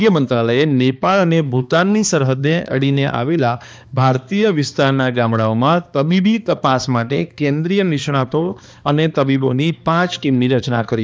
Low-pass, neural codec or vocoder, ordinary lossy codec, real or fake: none; codec, 16 kHz, 2 kbps, X-Codec, HuBERT features, trained on balanced general audio; none; fake